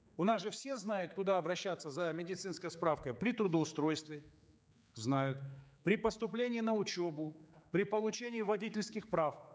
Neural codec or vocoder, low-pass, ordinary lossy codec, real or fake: codec, 16 kHz, 4 kbps, X-Codec, HuBERT features, trained on general audio; none; none; fake